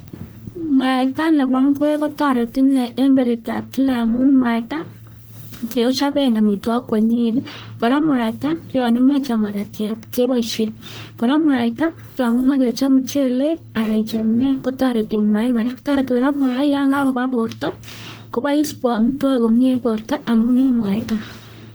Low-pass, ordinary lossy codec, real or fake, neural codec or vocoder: none; none; fake; codec, 44.1 kHz, 1.7 kbps, Pupu-Codec